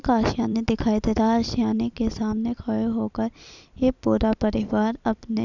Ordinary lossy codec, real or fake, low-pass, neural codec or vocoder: none; fake; 7.2 kHz; vocoder, 44.1 kHz, 128 mel bands every 256 samples, BigVGAN v2